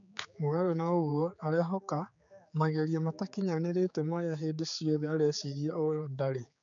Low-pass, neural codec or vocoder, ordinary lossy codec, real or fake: 7.2 kHz; codec, 16 kHz, 4 kbps, X-Codec, HuBERT features, trained on general audio; none; fake